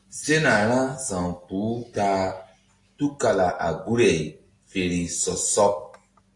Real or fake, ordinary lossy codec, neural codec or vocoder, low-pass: real; AAC, 48 kbps; none; 10.8 kHz